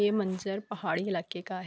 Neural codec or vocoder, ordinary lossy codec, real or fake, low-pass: none; none; real; none